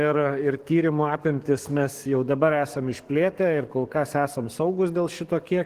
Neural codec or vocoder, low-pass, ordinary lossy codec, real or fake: codec, 44.1 kHz, 7.8 kbps, DAC; 14.4 kHz; Opus, 16 kbps; fake